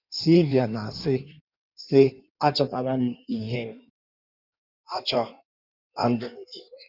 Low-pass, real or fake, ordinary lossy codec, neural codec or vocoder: 5.4 kHz; fake; none; codec, 16 kHz in and 24 kHz out, 1.1 kbps, FireRedTTS-2 codec